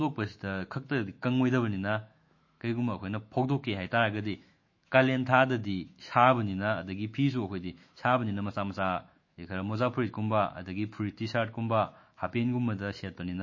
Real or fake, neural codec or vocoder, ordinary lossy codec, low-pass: fake; autoencoder, 48 kHz, 128 numbers a frame, DAC-VAE, trained on Japanese speech; MP3, 32 kbps; 7.2 kHz